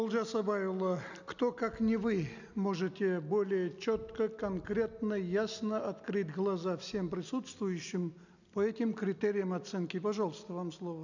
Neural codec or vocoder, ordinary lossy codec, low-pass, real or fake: none; none; 7.2 kHz; real